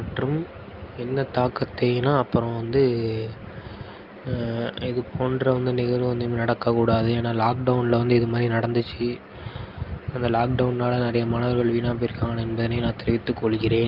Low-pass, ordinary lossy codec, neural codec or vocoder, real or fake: 5.4 kHz; Opus, 24 kbps; none; real